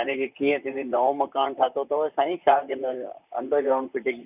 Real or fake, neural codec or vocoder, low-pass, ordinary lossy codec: fake; vocoder, 44.1 kHz, 128 mel bands, Pupu-Vocoder; 3.6 kHz; none